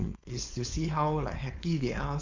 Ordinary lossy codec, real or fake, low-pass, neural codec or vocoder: Opus, 64 kbps; fake; 7.2 kHz; codec, 16 kHz, 4.8 kbps, FACodec